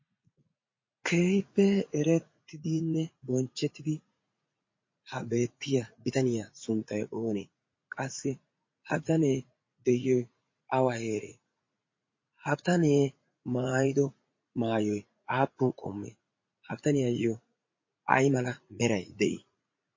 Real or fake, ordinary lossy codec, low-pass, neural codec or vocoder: fake; MP3, 32 kbps; 7.2 kHz; vocoder, 44.1 kHz, 80 mel bands, Vocos